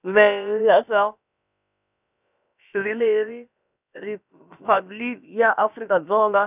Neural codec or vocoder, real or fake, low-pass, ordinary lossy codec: codec, 16 kHz, about 1 kbps, DyCAST, with the encoder's durations; fake; 3.6 kHz; none